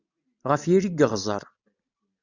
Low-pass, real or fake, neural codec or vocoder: 7.2 kHz; real; none